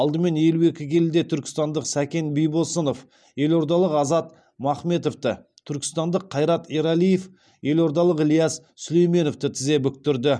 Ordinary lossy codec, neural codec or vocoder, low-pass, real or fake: none; none; none; real